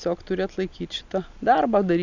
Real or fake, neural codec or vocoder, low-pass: real; none; 7.2 kHz